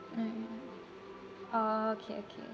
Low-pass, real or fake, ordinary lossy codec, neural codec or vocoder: none; real; none; none